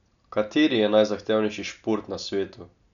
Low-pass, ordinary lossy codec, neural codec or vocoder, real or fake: 7.2 kHz; none; none; real